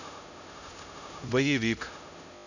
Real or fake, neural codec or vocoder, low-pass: fake; codec, 16 kHz, 0.5 kbps, X-Codec, HuBERT features, trained on LibriSpeech; 7.2 kHz